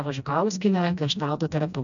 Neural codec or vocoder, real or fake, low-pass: codec, 16 kHz, 1 kbps, FreqCodec, smaller model; fake; 7.2 kHz